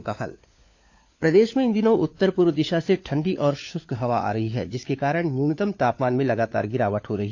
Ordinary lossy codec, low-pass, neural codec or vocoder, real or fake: AAC, 48 kbps; 7.2 kHz; codec, 16 kHz, 4 kbps, FunCodec, trained on LibriTTS, 50 frames a second; fake